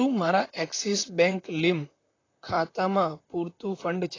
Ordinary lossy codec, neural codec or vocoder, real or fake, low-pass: AAC, 32 kbps; vocoder, 44.1 kHz, 128 mel bands, Pupu-Vocoder; fake; 7.2 kHz